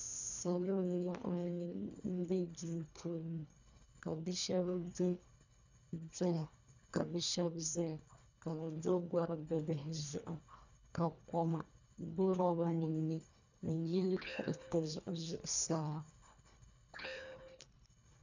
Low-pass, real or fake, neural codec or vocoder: 7.2 kHz; fake; codec, 24 kHz, 1.5 kbps, HILCodec